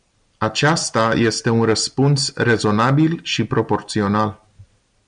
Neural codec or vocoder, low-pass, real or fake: none; 9.9 kHz; real